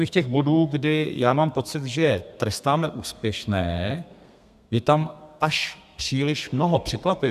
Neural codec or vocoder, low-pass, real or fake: codec, 32 kHz, 1.9 kbps, SNAC; 14.4 kHz; fake